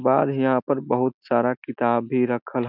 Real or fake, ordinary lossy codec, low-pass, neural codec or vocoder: real; none; 5.4 kHz; none